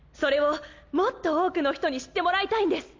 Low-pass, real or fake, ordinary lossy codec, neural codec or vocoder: 7.2 kHz; real; Opus, 32 kbps; none